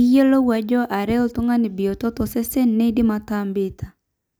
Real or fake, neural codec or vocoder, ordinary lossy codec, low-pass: real; none; none; none